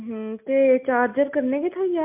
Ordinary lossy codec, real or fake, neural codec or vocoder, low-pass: MP3, 32 kbps; real; none; 3.6 kHz